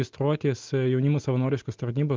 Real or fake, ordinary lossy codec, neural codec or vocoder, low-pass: real; Opus, 32 kbps; none; 7.2 kHz